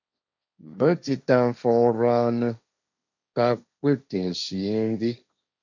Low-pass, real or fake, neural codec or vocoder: 7.2 kHz; fake; codec, 16 kHz, 1.1 kbps, Voila-Tokenizer